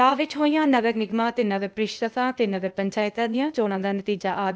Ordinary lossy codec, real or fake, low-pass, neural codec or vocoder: none; fake; none; codec, 16 kHz, 0.8 kbps, ZipCodec